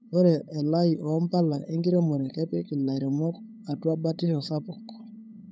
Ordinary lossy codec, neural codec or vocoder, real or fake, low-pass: none; codec, 16 kHz, 8 kbps, FunCodec, trained on LibriTTS, 25 frames a second; fake; none